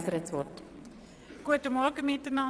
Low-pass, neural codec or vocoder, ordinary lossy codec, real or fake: none; vocoder, 22.05 kHz, 80 mel bands, Vocos; none; fake